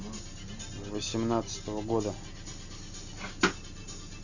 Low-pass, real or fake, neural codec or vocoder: 7.2 kHz; real; none